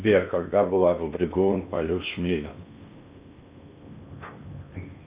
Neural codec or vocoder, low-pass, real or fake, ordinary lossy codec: codec, 16 kHz in and 24 kHz out, 0.8 kbps, FocalCodec, streaming, 65536 codes; 3.6 kHz; fake; Opus, 64 kbps